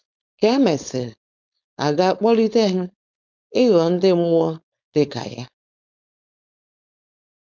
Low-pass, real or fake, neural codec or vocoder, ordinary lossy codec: 7.2 kHz; fake; codec, 16 kHz, 4.8 kbps, FACodec; none